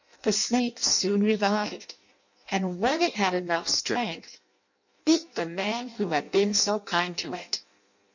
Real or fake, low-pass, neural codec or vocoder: fake; 7.2 kHz; codec, 16 kHz in and 24 kHz out, 0.6 kbps, FireRedTTS-2 codec